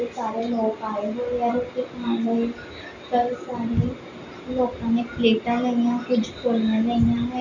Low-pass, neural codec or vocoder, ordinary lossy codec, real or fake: 7.2 kHz; none; none; real